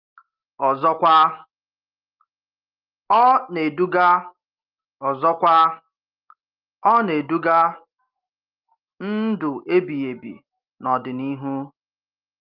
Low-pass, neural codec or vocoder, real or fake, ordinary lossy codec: 5.4 kHz; none; real; Opus, 32 kbps